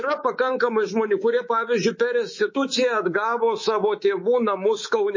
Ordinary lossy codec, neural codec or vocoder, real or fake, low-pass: MP3, 32 kbps; codec, 24 kHz, 3.1 kbps, DualCodec; fake; 7.2 kHz